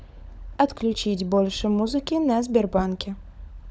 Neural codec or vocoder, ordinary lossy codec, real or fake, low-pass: codec, 16 kHz, 16 kbps, FunCodec, trained on LibriTTS, 50 frames a second; none; fake; none